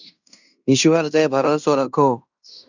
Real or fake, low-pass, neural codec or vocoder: fake; 7.2 kHz; codec, 16 kHz in and 24 kHz out, 0.9 kbps, LongCat-Audio-Codec, fine tuned four codebook decoder